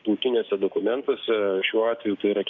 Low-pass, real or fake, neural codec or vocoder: 7.2 kHz; fake; codec, 16 kHz, 6 kbps, DAC